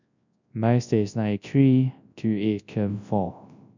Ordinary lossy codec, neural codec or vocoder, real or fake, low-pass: none; codec, 24 kHz, 0.9 kbps, WavTokenizer, large speech release; fake; 7.2 kHz